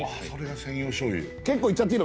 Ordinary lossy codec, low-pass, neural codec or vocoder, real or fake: none; none; none; real